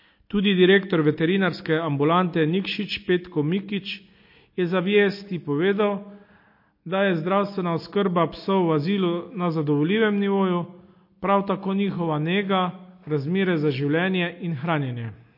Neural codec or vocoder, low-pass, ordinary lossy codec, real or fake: none; 5.4 kHz; MP3, 32 kbps; real